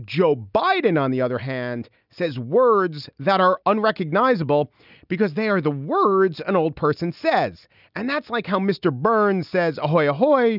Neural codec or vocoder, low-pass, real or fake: none; 5.4 kHz; real